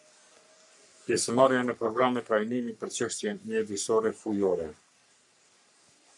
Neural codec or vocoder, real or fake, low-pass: codec, 44.1 kHz, 3.4 kbps, Pupu-Codec; fake; 10.8 kHz